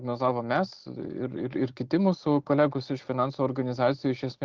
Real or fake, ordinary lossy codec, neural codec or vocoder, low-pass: real; Opus, 16 kbps; none; 7.2 kHz